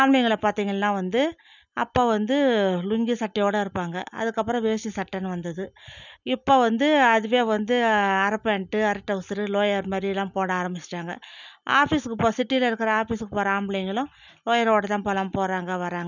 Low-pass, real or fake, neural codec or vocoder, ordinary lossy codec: 7.2 kHz; real; none; none